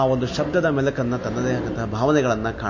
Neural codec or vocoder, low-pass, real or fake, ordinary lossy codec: none; 7.2 kHz; real; MP3, 32 kbps